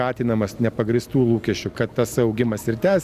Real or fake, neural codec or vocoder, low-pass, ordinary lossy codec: real; none; 14.4 kHz; Opus, 64 kbps